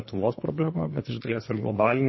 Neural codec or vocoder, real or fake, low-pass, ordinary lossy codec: codec, 24 kHz, 3 kbps, HILCodec; fake; 7.2 kHz; MP3, 24 kbps